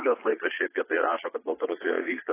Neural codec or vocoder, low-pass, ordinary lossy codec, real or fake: vocoder, 22.05 kHz, 80 mel bands, Vocos; 3.6 kHz; AAC, 16 kbps; fake